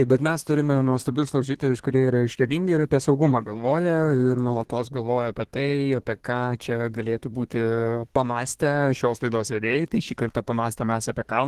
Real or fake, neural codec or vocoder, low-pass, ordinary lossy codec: fake; codec, 32 kHz, 1.9 kbps, SNAC; 14.4 kHz; Opus, 16 kbps